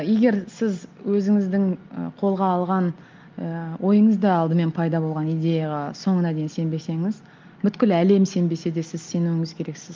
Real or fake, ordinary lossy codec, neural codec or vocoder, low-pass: real; Opus, 24 kbps; none; 7.2 kHz